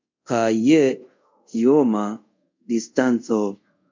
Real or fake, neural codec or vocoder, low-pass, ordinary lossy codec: fake; codec, 24 kHz, 0.5 kbps, DualCodec; 7.2 kHz; MP3, 64 kbps